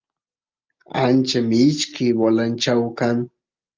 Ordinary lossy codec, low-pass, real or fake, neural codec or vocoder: Opus, 32 kbps; 7.2 kHz; real; none